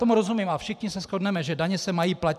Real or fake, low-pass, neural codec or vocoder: fake; 14.4 kHz; vocoder, 44.1 kHz, 128 mel bands every 512 samples, BigVGAN v2